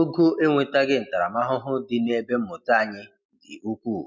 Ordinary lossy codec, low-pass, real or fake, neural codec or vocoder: MP3, 64 kbps; 7.2 kHz; real; none